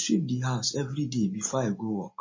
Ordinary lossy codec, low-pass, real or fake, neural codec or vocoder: MP3, 32 kbps; 7.2 kHz; real; none